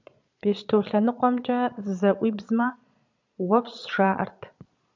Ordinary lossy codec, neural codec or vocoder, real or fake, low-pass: MP3, 64 kbps; vocoder, 22.05 kHz, 80 mel bands, Vocos; fake; 7.2 kHz